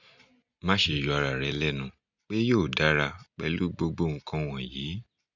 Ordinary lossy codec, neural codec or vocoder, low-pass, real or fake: none; none; 7.2 kHz; real